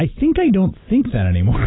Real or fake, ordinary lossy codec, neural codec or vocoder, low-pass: real; AAC, 16 kbps; none; 7.2 kHz